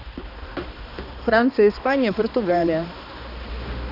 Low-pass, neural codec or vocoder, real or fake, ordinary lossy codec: 5.4 kHz; codec, 16 kHz, 2 kbps, X-Codec, HuBERT features, trained on balanced general audio; fake; none